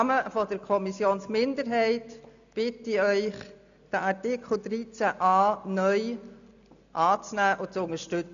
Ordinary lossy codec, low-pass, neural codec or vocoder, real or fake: none; 7.2 kHz; none; real